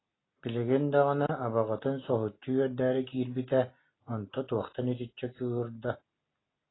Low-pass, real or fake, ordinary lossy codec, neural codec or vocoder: 7.2 kHz; real; AAC, 16 kbps; none